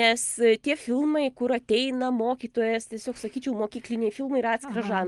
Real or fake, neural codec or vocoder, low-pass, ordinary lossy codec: real; none; 10.8 kHz; Opus, 24 kbps